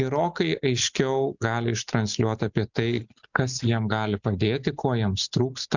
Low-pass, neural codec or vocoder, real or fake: 7.2 kHz; none; real